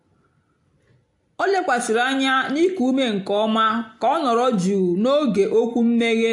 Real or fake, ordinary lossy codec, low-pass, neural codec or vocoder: real; AAC, 64 kbps; 10.8 kHz; none